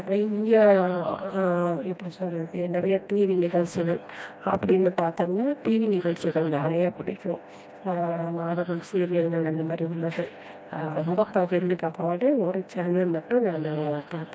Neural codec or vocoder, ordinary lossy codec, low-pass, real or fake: codec, 16 kHz, 1 kbps, FreqCodec, smaller model; none; none; fake